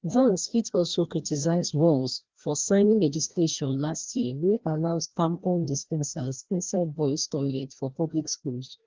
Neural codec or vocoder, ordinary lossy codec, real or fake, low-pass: codec, 16 kHz, 1 kbps, FreqCodec, larger model; Opus, 16 kbps; fake; 7.2 kHz